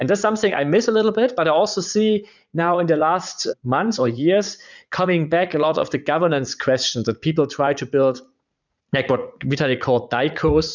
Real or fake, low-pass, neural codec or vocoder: fake; 7.2 kHz; vocoder, 44.1 kHz, 80 mel bands, Vocos